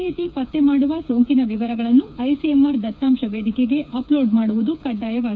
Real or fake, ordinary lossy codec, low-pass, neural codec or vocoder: fake; none; none; codec, 16 kHz, 4 kbps, FreqCodec, smaller model